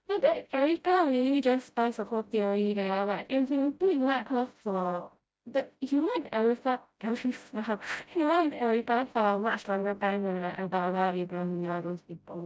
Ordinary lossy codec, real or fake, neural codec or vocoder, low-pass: none; fake; codec, 16 kHz, 0.5 kbps, FreqCodec, smaller model; none